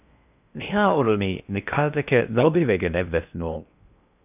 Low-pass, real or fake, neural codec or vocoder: 3.6 kHz; fake; codec, 16 kHz in and 24 kHz out, 0.6 kbps, FocalCodec, streaming, 4096 codes